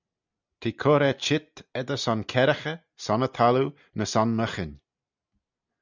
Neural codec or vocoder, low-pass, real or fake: none; 7.2 kHz; real